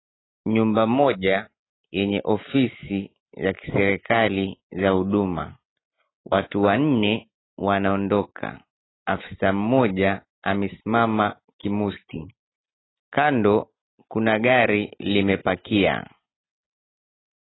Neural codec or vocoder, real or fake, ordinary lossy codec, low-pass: none; real; AAC, 16 kbps; 7.2 kHz